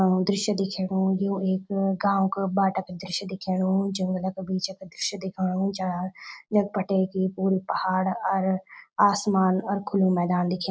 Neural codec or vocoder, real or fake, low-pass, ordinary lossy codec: none; real; none; none